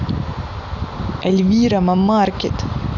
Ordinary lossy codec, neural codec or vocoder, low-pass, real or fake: AAC, 48 kbps; vocoder, 44.1 kHz, 128 mel bands every 256 samples, BigVGAN v2; 7.2 kHz; fake